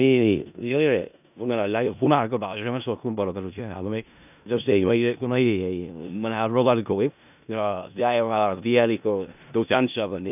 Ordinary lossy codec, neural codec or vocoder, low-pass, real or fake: none; codec, 16 kHz in and 24 kHz out, 0.4 kbps, LongCat-Audio-Codec, four codebook decoder; 3.6 kHz; fake